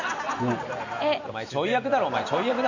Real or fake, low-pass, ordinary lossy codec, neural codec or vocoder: real; 7.2 kHz; none; none